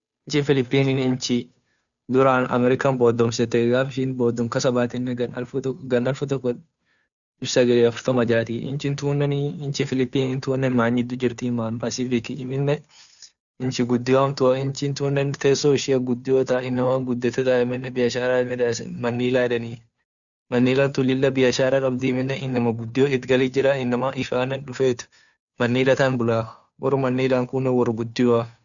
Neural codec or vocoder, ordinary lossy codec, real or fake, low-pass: codec, 16 kHz, 2 kbps, FunCodec, trained on Chinese and English, 25 frames a second; none; fake; 7.2 kHz